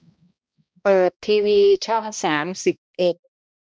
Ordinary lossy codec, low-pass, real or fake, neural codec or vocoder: none; none; fake; codec, 16 kHz, 1 kbps, X-Codec, HuBERT features, trained on balanced general audio